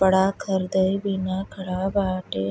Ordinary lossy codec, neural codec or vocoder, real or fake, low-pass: none; none; real; none